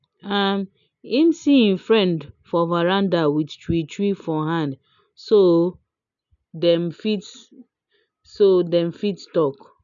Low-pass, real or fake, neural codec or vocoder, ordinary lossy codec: 7.2 kHz; real; none; none